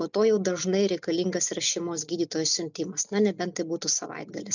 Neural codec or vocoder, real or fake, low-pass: none; real; 7.2 kHz